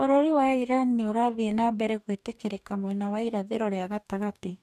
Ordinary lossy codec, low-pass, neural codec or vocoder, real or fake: none; 14.4 kHz; codec, 44.1 kHz, 2.6 kbps, DAC; fake